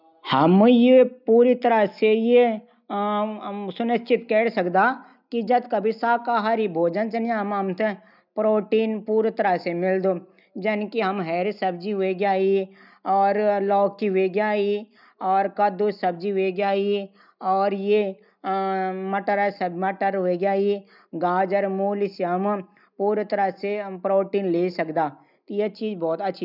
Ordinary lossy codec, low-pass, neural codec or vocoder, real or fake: none; 5.4 kHz; none; real